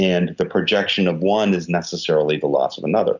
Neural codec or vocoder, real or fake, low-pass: none; real; 7.2 kHz